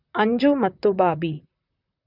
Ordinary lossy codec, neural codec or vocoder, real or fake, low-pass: none; vocoder, 44.1 kHz, 128 mel bands, Pupu-Vocoder; fake; 5.4 kHz